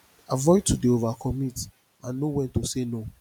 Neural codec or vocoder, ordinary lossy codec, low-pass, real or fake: none; none; 19.8 kHz; real